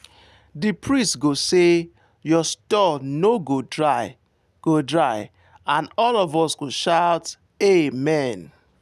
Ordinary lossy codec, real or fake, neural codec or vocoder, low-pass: none; real; none; 14.4 kHz